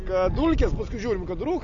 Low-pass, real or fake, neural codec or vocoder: 7.2 kHz; real; none